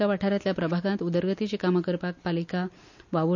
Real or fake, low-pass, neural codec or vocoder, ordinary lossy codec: real; 7.2 kHz; none; none